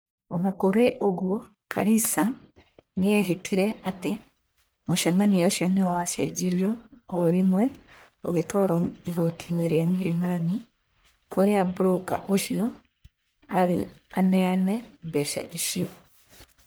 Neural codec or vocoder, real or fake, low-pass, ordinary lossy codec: codec, 44.1 kHz, 1.7 kbps, Pupu-Codec; fake; none; none